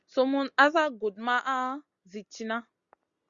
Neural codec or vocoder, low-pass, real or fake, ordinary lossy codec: none; 7.2 kHz; real; Opus, 64 kbps